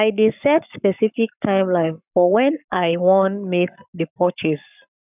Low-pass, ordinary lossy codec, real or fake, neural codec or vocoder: 3.6 kHz; none; fake; codec, 44.1 kHz, 7.8 kbps, Pupu-Codec